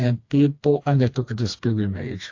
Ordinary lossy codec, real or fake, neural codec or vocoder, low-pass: AAC, 48 kbps; fake; codec, 16 kHz, 2 kbps, FreqCodec, smaller model; 7.2 kHz